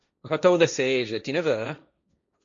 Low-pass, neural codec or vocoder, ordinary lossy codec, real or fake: 7.2 kHz; codec, 16 kHz, 1.1 kbps, Voila-Tokenizer; MP3, 48 kbps; fake